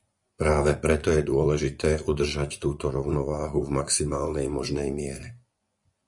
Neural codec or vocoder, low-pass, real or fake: vocoder, 24 kHz, 100 mel bands, Vocos; 10.8 kHz; fake